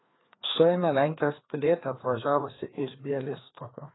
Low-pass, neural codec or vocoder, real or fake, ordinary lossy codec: 7.2 kHz; codec, 16 kHz, 2 kbps, FreqCodec, larger model; fake; AAC, 16 kbps